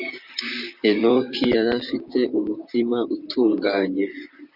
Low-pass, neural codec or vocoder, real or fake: 5.4 kHz; vocoder, 44.1 kHz, 128 mel bands, Pupu-Vocoder; fake